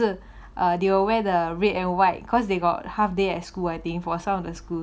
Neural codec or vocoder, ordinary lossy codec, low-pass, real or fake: none; none; none; real